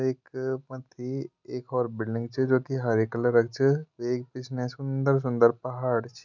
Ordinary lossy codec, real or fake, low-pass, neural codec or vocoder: none; real; 7.2 kHz; none